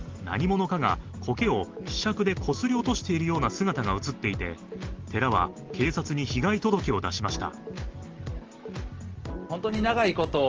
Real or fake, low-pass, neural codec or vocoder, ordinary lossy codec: real; 7.2 kHz; none; Opus, 16 kbps